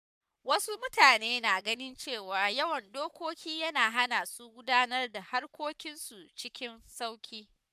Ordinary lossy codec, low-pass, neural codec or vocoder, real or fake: none; 14.4 kHz; codec, 44.1 kHz, 7.8 kbps, Pupu-Codec; fake